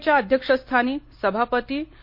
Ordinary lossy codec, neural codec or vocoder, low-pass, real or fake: MP3, 32 kbps; none; 5.4 kHz; real